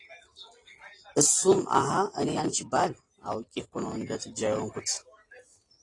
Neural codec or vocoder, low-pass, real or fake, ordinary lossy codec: none; 10.8 kHz; real; AAC, 32 kbps